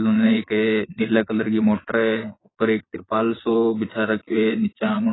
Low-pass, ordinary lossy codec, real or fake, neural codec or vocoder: 7.2 kHz; AAC, 16 kbps; fake; vocoder, 22.05 kHz, 80 mel bands, Vocos